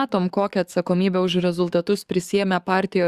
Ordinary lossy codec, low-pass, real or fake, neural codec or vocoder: Opus, 64 kbps; 14.4 kHz; fake; codec, 44.1 kHz, 7.8 kbps, DAC